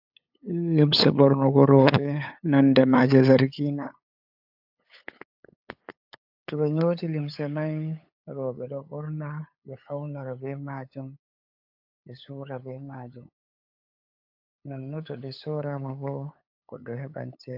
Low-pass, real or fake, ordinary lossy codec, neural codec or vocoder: 5.4 kHz; fake; AAC, 48 kbps; codec, 16 kHz, 8 kbps, FunCodec, trained on LibriTTS, 25 frames a second